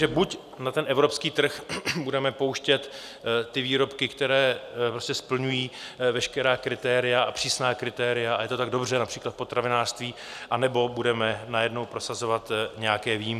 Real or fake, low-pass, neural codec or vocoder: real; 14.4 kHz; none